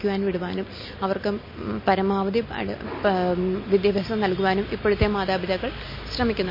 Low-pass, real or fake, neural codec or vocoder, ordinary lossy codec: 5.4 kHz; real; none; MP3, 24 kbps